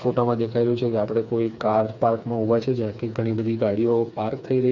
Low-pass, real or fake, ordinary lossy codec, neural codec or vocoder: 7.2 kHz; fake; none; codec, 16 kHz, 4 kbps, FreqCodec, smaller model